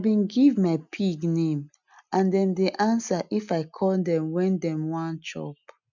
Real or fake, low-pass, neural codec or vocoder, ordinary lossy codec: real; 7.2 kHz; none; none